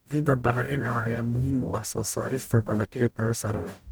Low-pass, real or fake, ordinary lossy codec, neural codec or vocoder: none; fake; none; codec, 44.1 kHz, 0.9 kbps, DAC